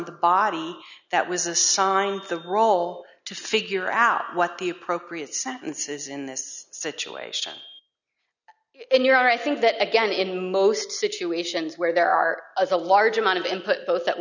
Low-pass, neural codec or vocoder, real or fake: 7.2 kHz; none; real